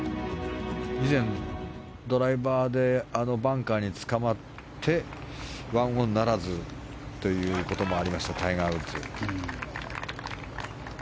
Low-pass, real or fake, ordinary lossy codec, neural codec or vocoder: none; real; none; none